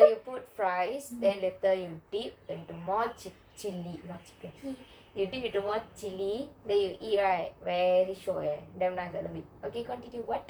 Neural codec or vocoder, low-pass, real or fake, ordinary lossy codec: vocoder, 44.1 kHz, 128 mel bands, Pupu-Vocoder; none; fake; none